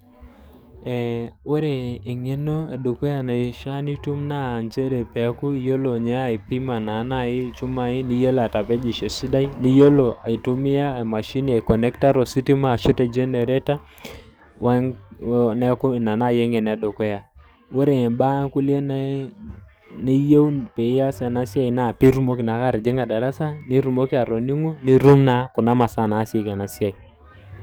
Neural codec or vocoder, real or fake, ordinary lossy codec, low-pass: codec, 44.1 kHz, 7.8 kbps, DAC; fake; none; none